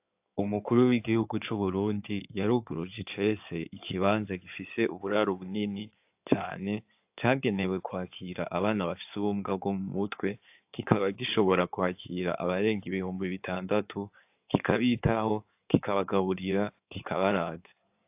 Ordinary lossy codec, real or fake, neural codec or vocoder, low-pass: AAC, 32 kbps; fake; codec, 16 kHz in and 24 kHz out, 2.2 kbps, FireRedTTS-2 codec; 3.6 kHz